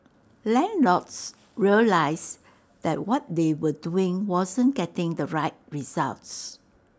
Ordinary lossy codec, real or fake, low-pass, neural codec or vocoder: none; real; none; none